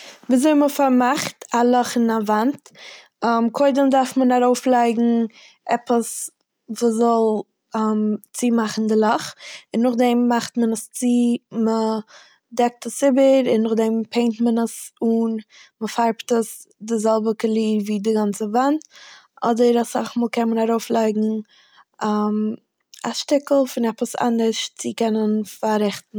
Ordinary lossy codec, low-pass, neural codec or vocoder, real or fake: none; none; none; real